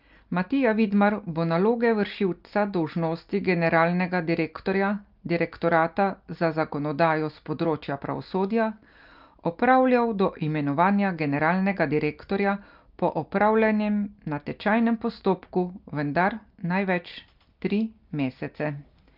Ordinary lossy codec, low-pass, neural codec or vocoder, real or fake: Opus, 24 kbps; 5.4 kHz; none; real